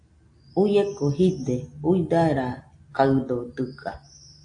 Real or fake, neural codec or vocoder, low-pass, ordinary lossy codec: real; none; 9.9 kHz; AAC, 48 kbps